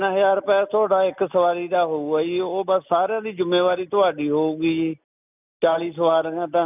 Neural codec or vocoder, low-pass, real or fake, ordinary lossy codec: none; 3.6 kHz; real; none